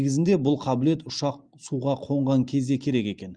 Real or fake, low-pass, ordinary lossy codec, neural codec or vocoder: real; 9.9 kHz; Opus, 32 kbps; none